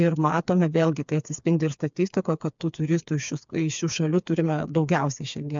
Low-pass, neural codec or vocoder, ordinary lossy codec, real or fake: 7.2 kHz; codec, 16 kHz, 4 kbps, FreqCodec, smaller model; MP3, 64 kbps; fake